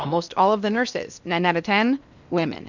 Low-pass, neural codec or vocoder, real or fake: 7.2 kHz; codec, 16 kHz in and 24 kHz out, 0.8 kbps, FocalCodec, streaming, 65536 codes; fake